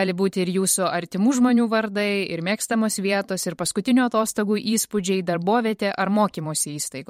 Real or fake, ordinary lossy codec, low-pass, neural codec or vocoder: fake; MP3, 64 kbps; 19.8 kHz; vocoder, 44.1 kHz, 128 mel bands every 512 samples, BigVGAN v2